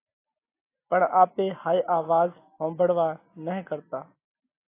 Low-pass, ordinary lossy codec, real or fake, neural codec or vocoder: 3.6 kHz; AAC, 24 kbps; real; none